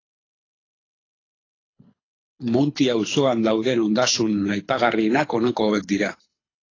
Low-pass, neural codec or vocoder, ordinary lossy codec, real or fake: 7.2 kHz; codec, 24 kHz, 6 kbps, HILCodec; AAC, 32 kbps; fake